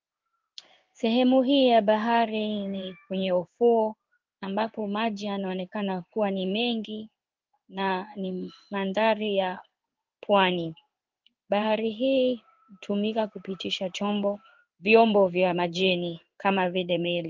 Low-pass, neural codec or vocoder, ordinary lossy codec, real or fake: 7.2 kHz; codec, 16 kHz in and 24 kHz out, 1 kbps, XY-Tokenizer; Opus, 24 kbps; fake